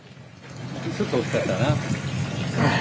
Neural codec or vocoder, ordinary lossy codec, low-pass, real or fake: none; none; none; real